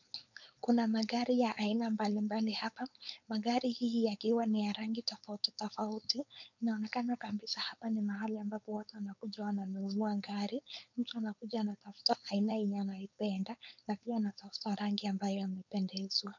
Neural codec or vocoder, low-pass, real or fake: codec, 16 kHz, 4.8 kbps, FACodec; 7.2 kHz; fake